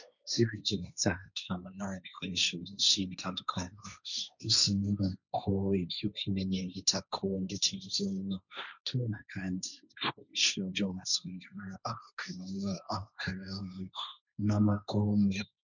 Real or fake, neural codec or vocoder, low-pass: fake; codec, 16 kHz, 1.1 kbps, Voila-Tokenizer; 7.2 kHz